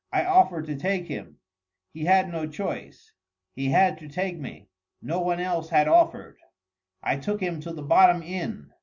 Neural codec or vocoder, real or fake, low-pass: none; real; 7.2 kHz